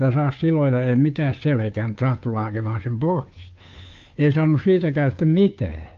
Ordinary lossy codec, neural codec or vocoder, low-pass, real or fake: Opus, 24 kbps; codec, 16 kHz, 2 kbps, FunCodec, trained on Chinese and English, 25 frames a second; 7.2 kHz; fake